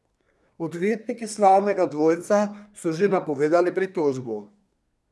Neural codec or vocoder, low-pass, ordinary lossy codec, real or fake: codec, 24 kHz, 1 kbps, SNAC; none; none; fake